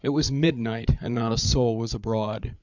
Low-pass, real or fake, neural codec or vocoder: 7.2 kHz; fake; codec, 16 kHz, 8 kbps, FreqCodec, larger model